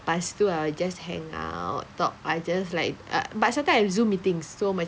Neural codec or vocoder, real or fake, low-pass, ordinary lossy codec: none; real; none; none